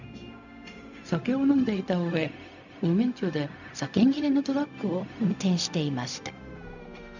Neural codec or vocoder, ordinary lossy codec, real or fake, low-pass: codec, 16 kHz, 0.4 kbps, LongCat-Audio-Codec; none; fake; 7.2 kHz